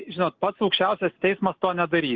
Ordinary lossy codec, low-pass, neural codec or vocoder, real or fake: Opus, 24 kbps; 7.2 kHz; none; real